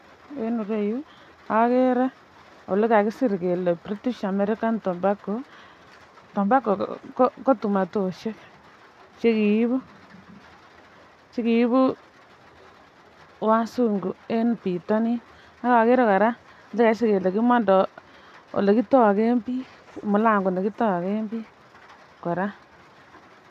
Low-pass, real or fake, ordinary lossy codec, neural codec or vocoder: 14.4 kHz; real; none; none